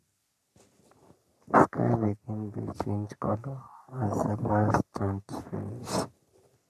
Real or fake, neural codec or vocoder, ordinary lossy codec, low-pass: fake; codec, 44.1 kHz, 3.4 kbps, Pupu-Codec; none; 14.4 kHz